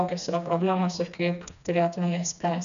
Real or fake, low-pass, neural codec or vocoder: fake; 7.2 kHz; codec, 16 kHz, 2 kbps, FreqCodec, smaller model